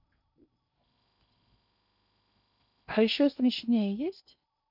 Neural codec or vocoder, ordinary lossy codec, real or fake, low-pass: codec, 16 kHz in and 24 kHz out, 0.8 kbps, FocalCodec, streaming, 65536 codes; none; fake; 5.4 kHz